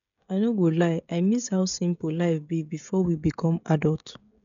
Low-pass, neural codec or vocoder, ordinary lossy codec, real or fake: 7.2 kHz; codec, 16 kHz, 16 kbps, FreqCodec, smaller model; none; fake